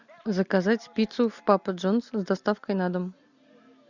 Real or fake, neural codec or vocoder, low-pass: real; none; 7.2 kHz